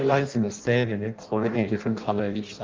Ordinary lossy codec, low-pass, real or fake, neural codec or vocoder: Opus, 24 kbps; 7.2 kHz; fake; codec, 16 kHz in and 24 kHz out, 0.6 kbps, FireRedTTS-2 codec